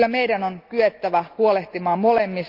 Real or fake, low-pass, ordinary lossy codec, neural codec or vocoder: real; 5.4 kHz; Opus, 32 kbps; none